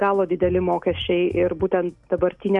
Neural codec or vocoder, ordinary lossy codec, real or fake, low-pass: none; AAC, 64 kbps; real; 10.8 kHz